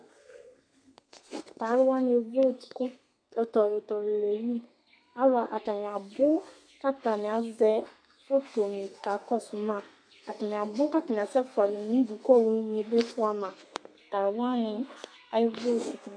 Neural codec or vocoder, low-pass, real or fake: codec, 32 kHz, 1.9 kbps, SNAC; 9.9 kHz; fake